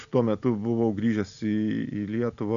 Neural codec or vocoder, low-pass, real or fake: none; 7.2 kHz; real